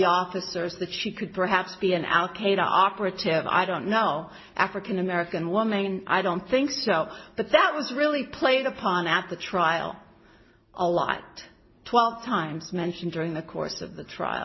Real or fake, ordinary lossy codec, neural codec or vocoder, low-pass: real; MP3, 24 kbps; none; 7.2 kHz